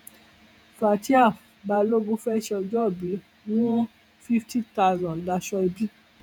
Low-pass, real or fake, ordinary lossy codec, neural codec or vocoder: 19.8 kHz; fake; none; vocoder, 48 kHz, 128 mel bands, Vocos